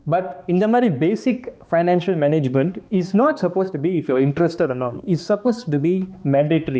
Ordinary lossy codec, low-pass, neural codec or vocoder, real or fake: none; none; codec, 16 kHz, 2 kbps, X-Codec, HuBERT features, trained on balanced general audio; fake